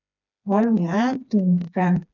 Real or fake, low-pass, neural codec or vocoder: fake; 7.2 kHz; codec, 16 kHz, 2 kbps, FreqCodec, smaller model